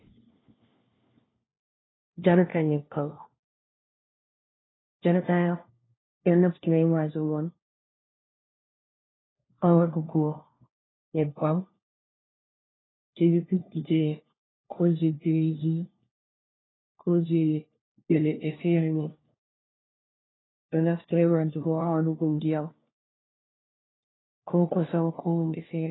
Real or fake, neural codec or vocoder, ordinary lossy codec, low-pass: fake; codec, 16 kHz, 1 kbps, FunCodec, trained on LibriTTS, 50 frames a second; AAC, 16 kbps; 7.2 kHz